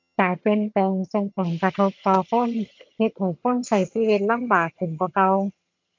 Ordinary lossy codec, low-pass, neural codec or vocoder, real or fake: MP3, 64 kbps; 7.2 kHz; vocoder, 22.05 kHz, 80 mel bands, HiFi-GAN; fake